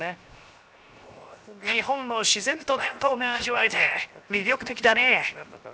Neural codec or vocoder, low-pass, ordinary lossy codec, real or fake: codec, 16 kHz, 0.7 kbps, FocalCodec; none; none; fake